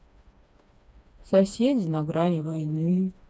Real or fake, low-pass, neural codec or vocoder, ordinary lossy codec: fake; none; codec, 16 kHz, 2 kbps, FreqCodec, smaller model; none